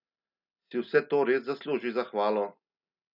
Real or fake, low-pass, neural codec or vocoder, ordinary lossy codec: real; 5.4 kHz; none; none